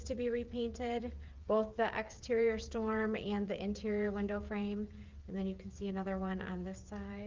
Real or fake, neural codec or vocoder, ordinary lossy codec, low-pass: fake; codec, 16 kHz, 8 kbps, FreqCodec, smaller model; Opus, 24 kbps; 7.2 kHz